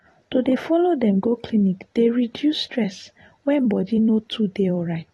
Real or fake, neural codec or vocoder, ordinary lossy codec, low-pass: fake; vocoder, 44.1 kHz, 128 mel bands every 256 samples, BigVGAN v2; AAC, 32 kbps; 19.8 kHz